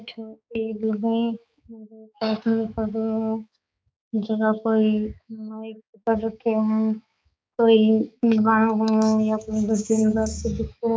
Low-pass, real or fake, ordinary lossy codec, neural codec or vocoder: none; fake; none; codec, 16 kHz, 4 kbps, X-Codec, HuBERT features, trained on balanced general audio